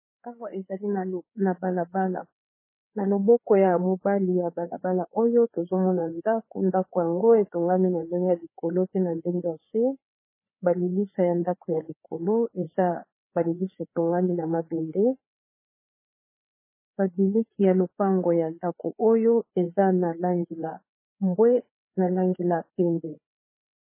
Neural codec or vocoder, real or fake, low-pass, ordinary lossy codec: codec, 16 kHz, 4 kbps, FreqCodec, larger model; fake; 3.6 kHz; MP3, 16 kbps